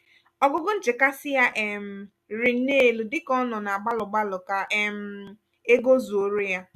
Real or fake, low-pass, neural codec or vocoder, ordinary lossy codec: real; 14.4 kHz; none; none